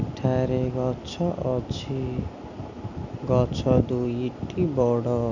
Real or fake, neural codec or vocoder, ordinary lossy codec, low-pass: real; none; none; 7.2 kHz